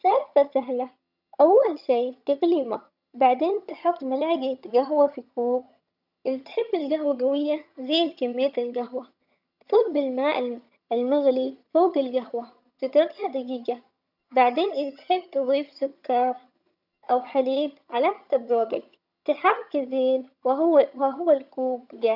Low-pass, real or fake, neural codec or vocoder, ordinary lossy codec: 5.4 kHz; fake; vocoder, 22.05 kHz, 80 mel bands, HiFi-GAN; none